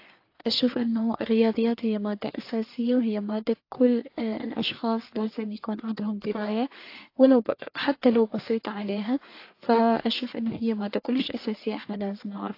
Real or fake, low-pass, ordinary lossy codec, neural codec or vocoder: fake; 5.4 kHz; AAC, 32 kbps; codec, 44.1 kHz, 1.7 kbps, Pupu-Codec